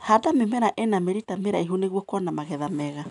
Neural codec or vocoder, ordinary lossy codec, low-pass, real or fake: none; none; 10.8 kHz; real